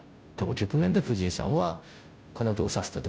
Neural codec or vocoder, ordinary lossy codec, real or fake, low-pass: codec, 16 kHz, 0.5 kbps, FunCodec, trained on Chinese and English, 25 frames a second; none; fake; none